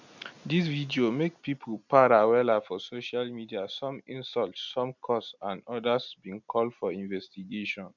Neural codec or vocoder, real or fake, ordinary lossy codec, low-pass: none; real; none; 7.2 kHz